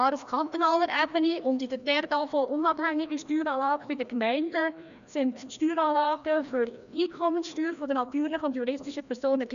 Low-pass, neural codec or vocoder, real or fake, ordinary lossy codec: 7.2 kHz; codec, 16 kHz, 1 kbps, FreqCodec, larger model; fake; none